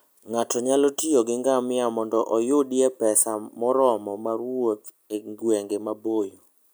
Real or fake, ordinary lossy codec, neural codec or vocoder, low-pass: real; none; none; none